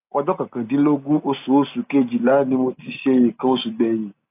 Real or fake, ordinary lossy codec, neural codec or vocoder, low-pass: real; AAC, 32 kbps; none; 3.6 kHz